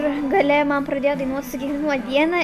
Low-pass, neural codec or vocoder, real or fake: 14.4 kHz; none; real